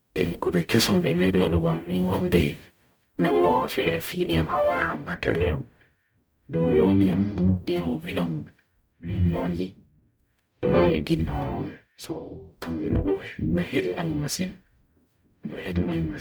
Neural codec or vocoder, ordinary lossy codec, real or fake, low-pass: codec, 44.1 kHz, 0.9 kbps, DAC; none; fake; none